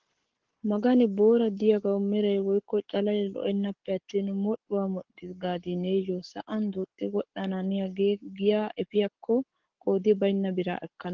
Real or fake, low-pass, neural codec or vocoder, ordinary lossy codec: fake; 7.2 kHz; codec, 44.1 kHz, 7.8 kbps, Pupu-Codec; Opus, 16 kbps